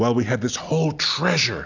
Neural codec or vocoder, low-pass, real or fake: none; 7.2 kHz; real